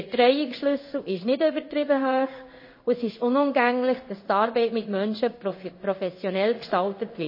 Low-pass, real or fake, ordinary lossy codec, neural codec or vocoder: 5.4 kHz; fake; MP3, 24 kbps; codec, 16 kHz in and 24 kHz out, 1 kbps, XY-Tokenizer